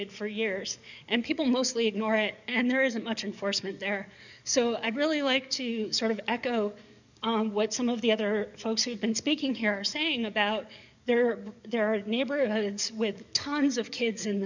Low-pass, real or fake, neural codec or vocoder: 7.2 kHz; fake; codec, 44.1 kHz, 7.8 kbps, DAC